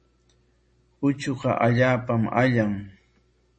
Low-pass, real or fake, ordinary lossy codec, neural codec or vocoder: 10.8 kHz; real; MP3, 32 kbps; none